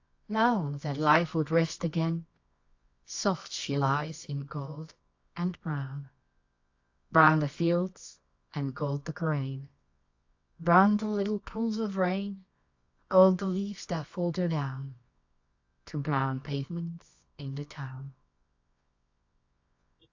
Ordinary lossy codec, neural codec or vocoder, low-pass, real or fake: AAC, 48 kbps; codec, 24 kHz, 0.9 kbps, WavTokenizer, medium music audio release; 7.2 kHz; fake